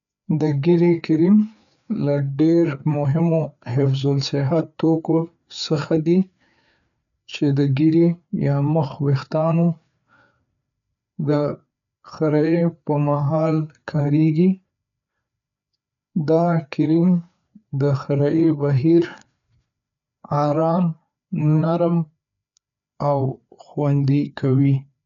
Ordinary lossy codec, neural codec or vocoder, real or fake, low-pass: none; codec, 16 kHz, 4 kbps, FreqCodec, larger model; fake; 7.2 kHz